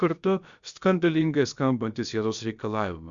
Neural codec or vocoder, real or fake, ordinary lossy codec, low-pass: codec, 16 kHz, about 1 kbps, DyCAST, with the encoder's durations; fake; Opus, 64 kbps; 7.2 kHz